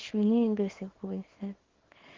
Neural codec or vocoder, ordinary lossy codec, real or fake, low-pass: codec, 24 kHz, 0.9 kbps, WavTokenizer, small release; Opus, 16 kbps; fake; 7.2 kHz